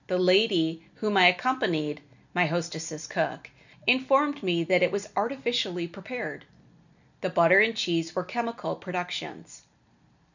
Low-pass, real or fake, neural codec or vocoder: 7.2 kHz; real; none